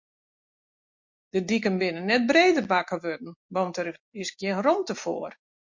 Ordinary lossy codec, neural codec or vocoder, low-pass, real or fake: MP3, 48 kbps; none; 7.2 kHz; real